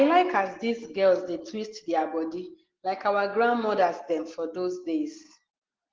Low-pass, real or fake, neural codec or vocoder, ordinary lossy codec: 7.2 kHz; real; none; Opus, 16 kbps